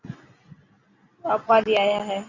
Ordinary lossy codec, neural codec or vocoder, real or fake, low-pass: Opus, 64 kbps; none; real; 7.2 kHz